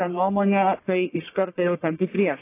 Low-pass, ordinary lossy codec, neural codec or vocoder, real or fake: 3.6 kHz; AAC, 24 kbps; codec, 44.1 kHz, 1.7 kbps, Pupu-Codec; fake